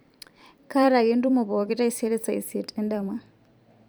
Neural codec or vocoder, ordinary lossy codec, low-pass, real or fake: vocoder, 44.1 kHz, 128 mel bands every 256 samples, BigVGAN v2; none; none; fake